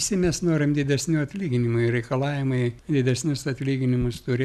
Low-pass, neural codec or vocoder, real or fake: 14.4 kHz; none; real